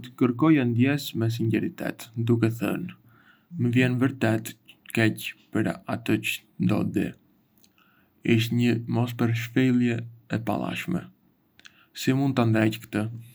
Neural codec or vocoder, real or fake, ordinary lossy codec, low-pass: none; real; none; none